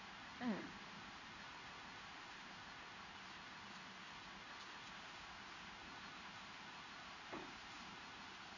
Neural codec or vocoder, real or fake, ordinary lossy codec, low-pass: codec, 16 kHz in and 24 kHz out, 1 kbps, XY-Tokenizer; fake; none; 7.2 kHz